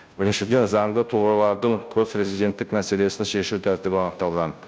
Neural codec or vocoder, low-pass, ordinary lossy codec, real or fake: codec, 16 kHz, 0.5 kbps, FunCodec, trained on Chinese and English, 25 frames a second; none; none; fake